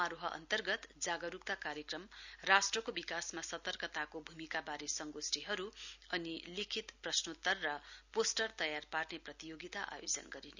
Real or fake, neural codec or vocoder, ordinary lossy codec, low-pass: real; none; none; 7.2 kHz